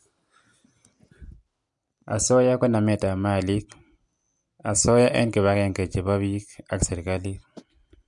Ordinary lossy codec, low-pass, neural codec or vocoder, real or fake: MP3, 64 kbps; 10.8 kHz; none; real